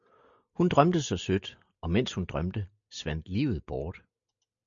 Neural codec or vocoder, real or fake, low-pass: none; real; 7.2 kHz